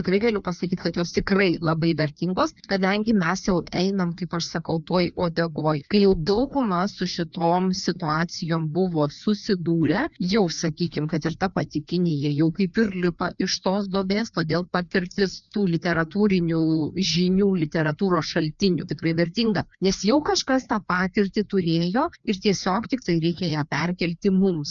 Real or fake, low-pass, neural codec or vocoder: fake; 7.2 kHz; codec, 16 kHz, 2 kbps, FreqCodec, larger model